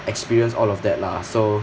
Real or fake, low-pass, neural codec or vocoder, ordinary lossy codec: real; none; none; none